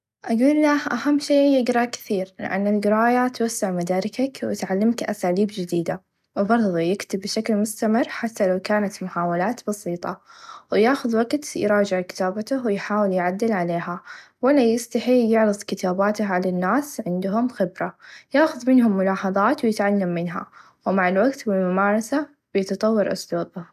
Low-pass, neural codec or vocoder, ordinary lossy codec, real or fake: 14.4 kHz; none; none; real